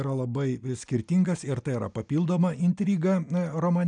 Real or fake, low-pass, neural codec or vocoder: real; 9.9 kHz; none